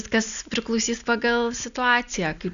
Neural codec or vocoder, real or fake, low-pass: none; real; 7.2 kHz